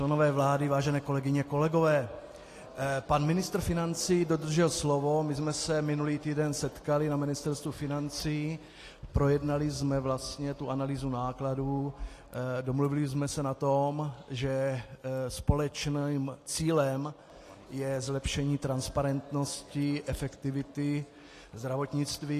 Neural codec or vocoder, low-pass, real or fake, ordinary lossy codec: none; 14.4 kHz; real; AAC, 48 kbps